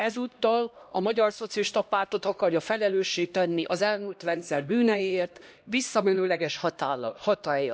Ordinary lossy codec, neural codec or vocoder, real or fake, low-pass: none; codec, 16 kHz, 1 kbps, X-Codec, HuBERT features, trained on LibriSpeech; fake; none